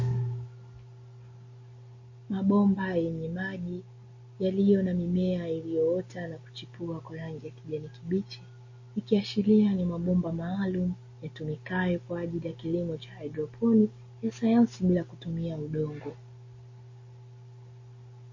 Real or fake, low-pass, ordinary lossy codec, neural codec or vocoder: real; 7.2 kHz; MP3, 32 kbps; none